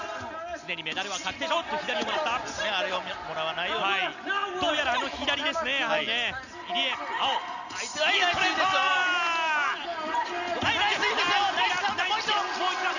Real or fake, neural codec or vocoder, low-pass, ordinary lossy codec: real; none; 7.2 kHz; none